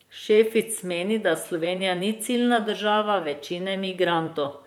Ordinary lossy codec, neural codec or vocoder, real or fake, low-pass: MP3, 96 kbps; vocoder, 44.1 kHz, 128 mel bands, Pupu-Vocoder; fake; 19.8 kHz